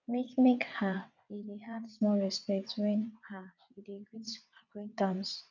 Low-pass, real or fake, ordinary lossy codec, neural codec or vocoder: 7.2 kHz; fake; none; vocoder, 22.05 kHz, 80 mel bands, WaveNeXt